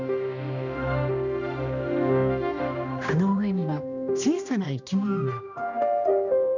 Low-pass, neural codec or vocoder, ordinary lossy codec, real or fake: 7.2 kHz; codec, 16 kHz, 1 kbps, X-Codec, HuBERT features, trained on general audio; none; fake